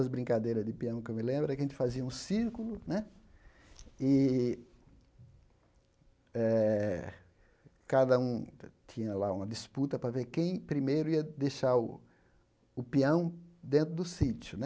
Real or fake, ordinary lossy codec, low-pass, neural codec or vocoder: real; none; none; none